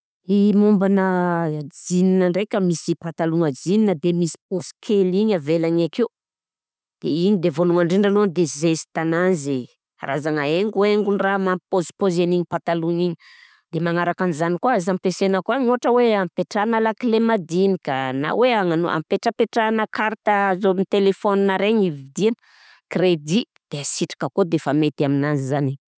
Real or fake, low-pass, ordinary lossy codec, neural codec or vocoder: real; none; none; none